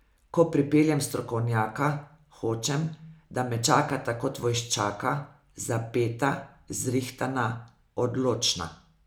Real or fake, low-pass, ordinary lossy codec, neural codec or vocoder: real; none; none; none